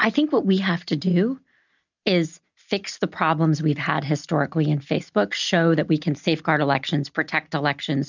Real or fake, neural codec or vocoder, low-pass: real; none; 7.2 kHz